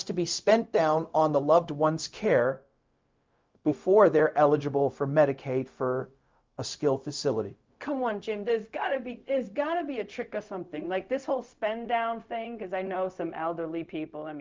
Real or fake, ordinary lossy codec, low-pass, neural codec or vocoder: fake; Opus, 24 kbps; 7.2 kHz; codec, 16 kHz, 0.4 kbps, LongCat-Audio-Codec